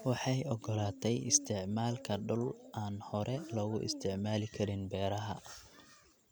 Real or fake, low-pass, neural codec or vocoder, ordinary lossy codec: real; none; none; none